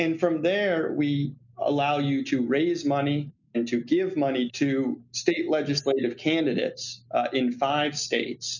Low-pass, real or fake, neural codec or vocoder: 7.2 kHz; real; none